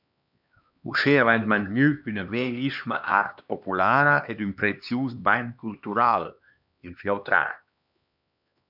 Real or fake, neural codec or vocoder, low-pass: fake; codec, 16 kHz, 2 kbps, X-Codec, HuBERT features, trained on LibriSpeech; 5.4 kHz